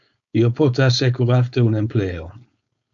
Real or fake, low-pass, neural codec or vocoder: fake; 7.2 kHz; codec, 16 kHz, 4.8 kbps, FACodec